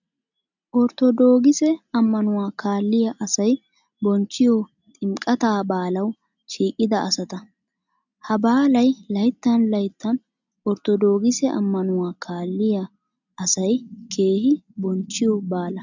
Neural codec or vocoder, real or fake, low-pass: none; real; 7.2 kHz